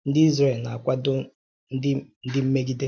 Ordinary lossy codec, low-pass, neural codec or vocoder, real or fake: none; none; none; real